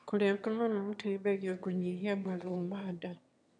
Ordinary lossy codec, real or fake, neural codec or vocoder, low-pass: MP3, 96 kbps; fake; autoencoder, 22.05 kHz, a latent of 192 numbers a frame, VITS, trained on one speaker; 9.9 kHz